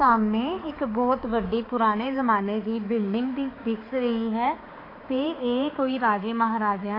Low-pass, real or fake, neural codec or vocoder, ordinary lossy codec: 5.4 kHz; fake; codec, 16 kHz, 4 kbps, X-Codec, HuBERT features, trained on balanced general audio; AAC, 24 kbps